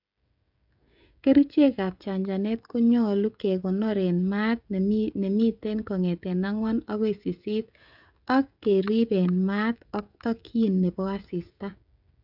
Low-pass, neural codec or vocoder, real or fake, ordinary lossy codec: 5.4 kHz; codec, 16 kHz, 16 kbps, FreqCodec, smaller model; fake; none